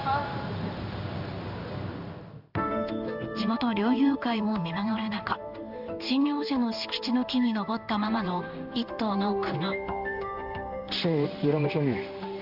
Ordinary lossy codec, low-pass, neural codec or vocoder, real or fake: Opus, 64 kbps; 5.4 kHz; codec, 16 kHz in and 24 kHz out, 1 kbps, XY-Tokenizer; fake